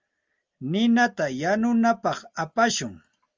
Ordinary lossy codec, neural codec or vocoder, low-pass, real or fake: Opus, 32 kbps; none; 7.2 kHz; real